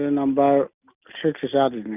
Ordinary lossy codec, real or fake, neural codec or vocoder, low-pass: none; real; none; 3.6 kHz